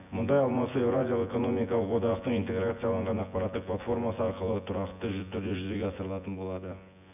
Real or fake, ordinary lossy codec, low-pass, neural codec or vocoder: fake; none; 3.6 kHz; vocoder, 24 kHz, 100 mel bands, Vocos